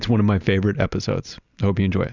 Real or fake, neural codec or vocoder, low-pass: real; none; 7.2 kHz